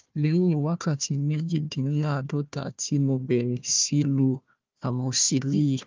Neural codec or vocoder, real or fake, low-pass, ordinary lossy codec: codec, 16 kHz, 1 kbps, FunCodec, trained on Chinese and English, 50 frames a second; fake; 7.2 kHz; Opus, 24 kbps